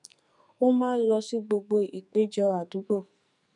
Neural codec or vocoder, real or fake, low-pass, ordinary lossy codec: codec, 44.1 kHz, 2.6 kbps, SNAC; fake; 10.8 kHz; none